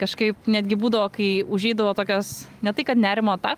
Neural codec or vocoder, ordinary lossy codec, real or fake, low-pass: vocoder, 44.1 kHz, 128 mel bands every 512 samples, BigVGAN v2; Opus, 32 kbps; fake; 14.4 kHz